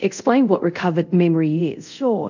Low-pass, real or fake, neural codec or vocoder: 7.2 kHz; fake; codec, 24 kHz, 0.5 kbps, DualCodec